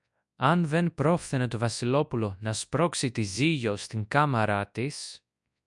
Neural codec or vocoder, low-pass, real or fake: codec, 24 kHz, 0.9 kbps, WavTokenizer, large speech release; 10.8 kHz; fake